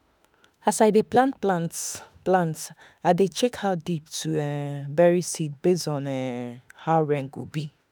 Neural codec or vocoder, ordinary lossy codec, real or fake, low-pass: autoencoder, 48 kHz, 32 numbers a frame, DAC-VAE, trained on Japanese speech; none; fake; none